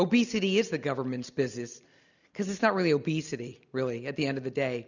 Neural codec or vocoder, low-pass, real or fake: none; 7.2 kHz; real